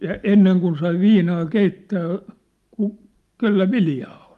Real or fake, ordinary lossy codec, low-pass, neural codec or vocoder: real; Opus, 32 kbps; 14.4 kHz; none